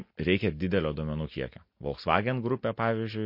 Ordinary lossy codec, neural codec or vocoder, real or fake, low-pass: MP3, 32 kbps; none; real; 5.4 kHz